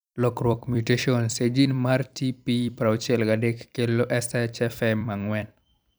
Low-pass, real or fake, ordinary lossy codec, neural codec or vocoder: none; fake; none; vocoder, 44.1 kHz, 128 mel bands every 256 samples, BigVGAN v2